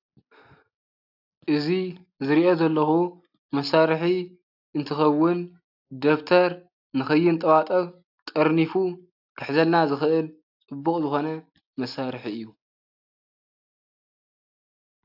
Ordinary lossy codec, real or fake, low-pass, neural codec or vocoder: AAC, 48 kbps; real; 5.4 kHz; none